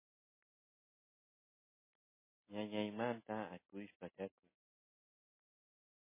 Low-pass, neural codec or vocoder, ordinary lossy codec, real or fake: 3.6 kHz; none; MP3, 16 kbps; real